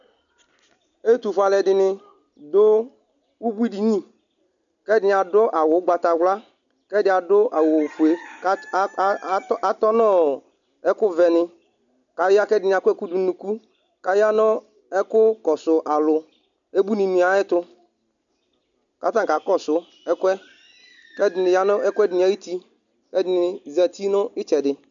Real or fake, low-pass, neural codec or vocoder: real; 7.2 kHz; none